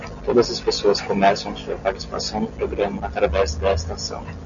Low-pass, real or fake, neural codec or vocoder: 7.2 kHz; real; none